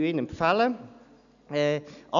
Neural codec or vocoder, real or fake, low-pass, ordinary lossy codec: none; real; 7.2 kHz; none